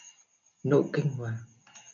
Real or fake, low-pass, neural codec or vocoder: real; 7.2 kHz; none